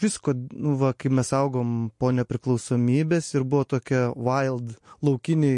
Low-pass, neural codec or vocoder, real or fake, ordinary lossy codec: 10.8 kHz; none; real; MP3, 48 kbps